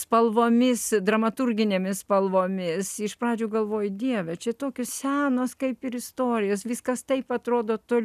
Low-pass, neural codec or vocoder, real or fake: 14.4 kHz; none; real